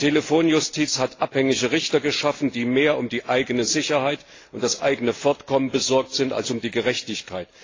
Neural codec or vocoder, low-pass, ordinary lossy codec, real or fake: none; 7.2 kHz; AAC, 32 kbps; real